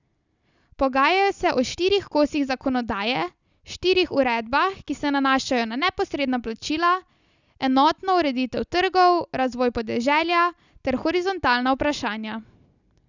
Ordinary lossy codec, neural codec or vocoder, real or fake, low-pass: none; none; real; 7.2 kHz